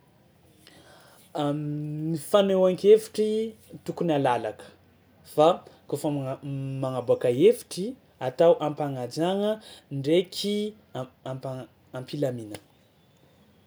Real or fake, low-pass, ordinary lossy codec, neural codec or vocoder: real; none; none; none